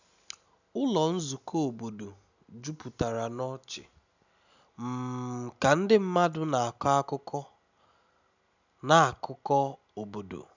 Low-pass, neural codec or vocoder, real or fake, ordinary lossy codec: 7.2 kHz; none; real; none